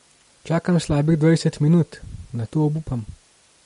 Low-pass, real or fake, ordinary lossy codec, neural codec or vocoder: 10.8 kHz; real; MP3, 48 kbps; none